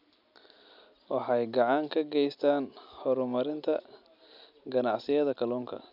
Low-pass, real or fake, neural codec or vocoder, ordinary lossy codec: 5.4 kHz; real; none; none